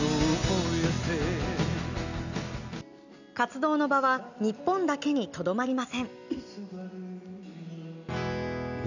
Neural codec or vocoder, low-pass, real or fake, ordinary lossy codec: none; 7.2 kHz; real; none